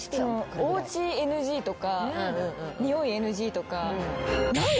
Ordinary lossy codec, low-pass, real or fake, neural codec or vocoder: none; none; real; none